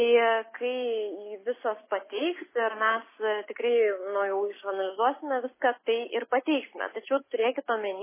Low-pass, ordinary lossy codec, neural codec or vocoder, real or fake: 3.6 kHz; MP3, 16 kbps; none; real